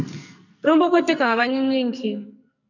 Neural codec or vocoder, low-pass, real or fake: codec, 44.1 kHz, 2.6 kbps, SNAC; 7.2 kHz; fake